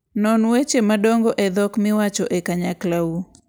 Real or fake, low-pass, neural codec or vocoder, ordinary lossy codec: real; none; none; none